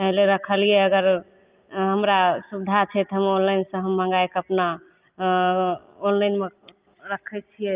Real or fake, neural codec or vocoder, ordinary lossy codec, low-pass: real; none; Opus, 24 kbps; 3.6 kHz